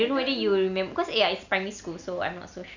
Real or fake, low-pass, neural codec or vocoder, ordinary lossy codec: real; 7.2 kHz; none; none